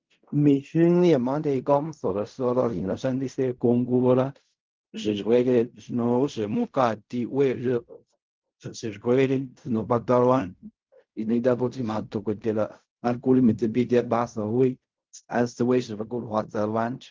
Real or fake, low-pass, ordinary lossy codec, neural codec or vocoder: fake; 7.2 kHz; Opus, 32 kbps; codec, 16 kHz in and 24 kHz out, 0.4 kbps, LongCat-Audio-Codec, fine tuned four codebook decoder